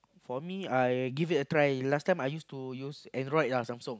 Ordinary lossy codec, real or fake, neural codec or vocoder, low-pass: none; real; none; none